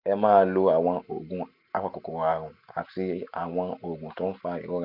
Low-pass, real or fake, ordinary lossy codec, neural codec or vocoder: 5.4 kHz; real; none; none